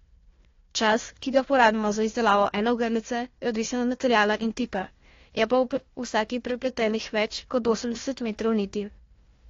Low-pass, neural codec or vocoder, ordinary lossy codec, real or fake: 7.2 kHz; codec, 16 kHz, 1 kbps, FunCodec, trained on Chinese and English, 50 frames a second; AAC, 32 kbps; fake